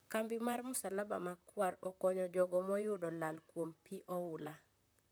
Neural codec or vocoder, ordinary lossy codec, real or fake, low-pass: vocoder, 44.1 kHz, 128 mel bands, Pupu-Vocoder; none; fake; none